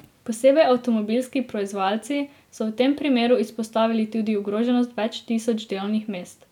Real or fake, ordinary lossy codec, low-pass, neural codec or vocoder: real; none; 19.8 kHz; none